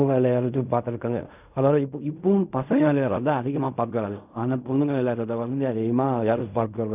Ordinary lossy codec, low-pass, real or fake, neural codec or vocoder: none; 3.6 kHz; fake; codec, 16 kHz in and 24 kHz out, 0.4 kbps, LongCat-Audio-Codec, fine tuned four codebook decoder